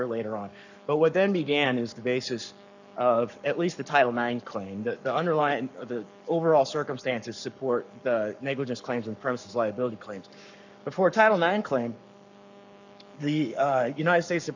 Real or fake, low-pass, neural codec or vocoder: fake; 7.2 kHz; codec, 44.1 kHz, 7.8 kbps, Pupu-Codec